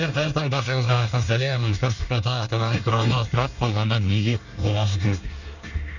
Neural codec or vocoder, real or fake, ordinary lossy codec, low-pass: codec, 24 kHz, 1 kbps, SNAC; fake; none; 7.2 kHz